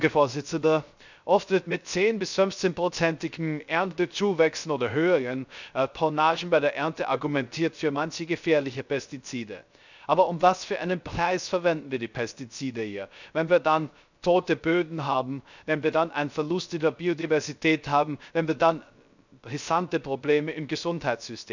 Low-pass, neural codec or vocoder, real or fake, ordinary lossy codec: 7.2 kHz; codec, 16 kHz, 0.3 kbps, FocalCodec; fake; none